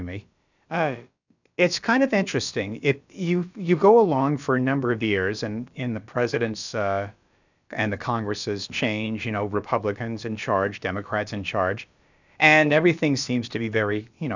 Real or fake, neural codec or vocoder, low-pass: fake; codec, 16 kHz, about 1 kbps, DyCAST, with the encoder's durations; 7.2 kHz